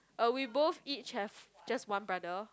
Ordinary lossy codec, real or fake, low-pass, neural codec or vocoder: none; real; none; none